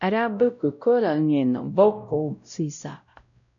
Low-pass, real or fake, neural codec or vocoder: 7.2 kHz; fake; codec, 16 kHz, 0.5 kbps, X-Codec, WavLM features, trained on Multilingual LibriSpeech